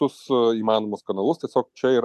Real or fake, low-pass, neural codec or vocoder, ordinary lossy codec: real; 14.4 kHz; none; AAC, 96 kbps